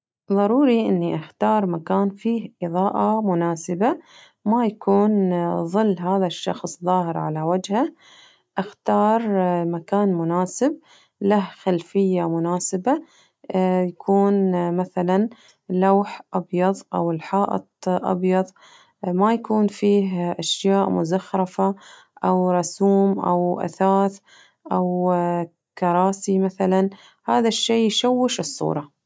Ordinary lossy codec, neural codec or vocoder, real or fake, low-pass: none; none; real; none